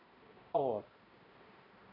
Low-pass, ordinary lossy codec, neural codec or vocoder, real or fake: 5.4 kHz; MP3, 32 kbps; codec, 16 kHz, 0.5 kbps, X-Codec, HuBERT features, trained on balanced general audio; fake